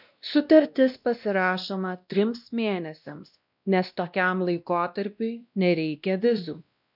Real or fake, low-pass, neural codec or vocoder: fake; 5.4 kHz; codec, 16 kHz, 1 kbps, X-Codec, WavLM features, trained on Multilingual LibriSpeech